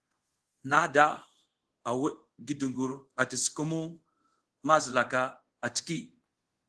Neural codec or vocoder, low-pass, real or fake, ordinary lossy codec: codec, 24 kHz, 0.5 kbps, DualCodec; 10.8 kHz; fake; Opus, 16 kbps